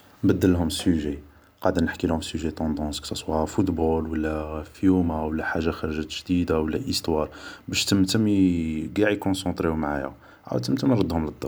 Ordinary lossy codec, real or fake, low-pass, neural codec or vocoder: none; real; none; none